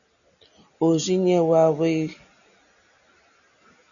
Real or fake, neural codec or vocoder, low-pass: real; none; 7.2 kHz